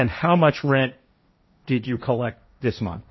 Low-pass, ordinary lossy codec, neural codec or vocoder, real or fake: 7.2 kHz; MP3, 24 kbps; codec, 16 kHz, 1.1 kbps, Voila-Tokenizer; fake